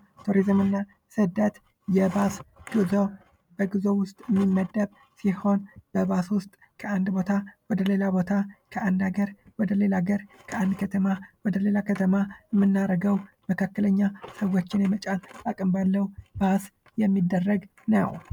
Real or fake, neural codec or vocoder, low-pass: real; none; 19.8 kHz